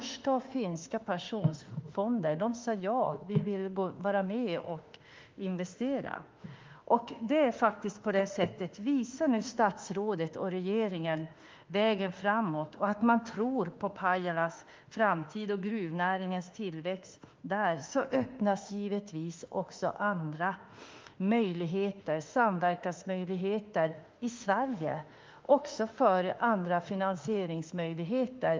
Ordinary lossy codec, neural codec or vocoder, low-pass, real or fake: Opus, 32 kbps; autoencoder, 48 kHz, 32 numbers a frame, DAC-VAE, trained on Japanese speech; 7.2 kHz; fake